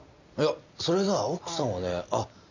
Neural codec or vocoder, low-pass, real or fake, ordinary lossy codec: none; 7.2 kHz; real; none